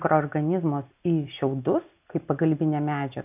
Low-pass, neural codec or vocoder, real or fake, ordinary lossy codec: 3.6 kHz; none; real; AAC, 32 kbps